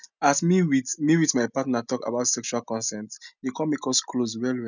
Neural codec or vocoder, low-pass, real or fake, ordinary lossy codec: none; 7.2 kHz; real; none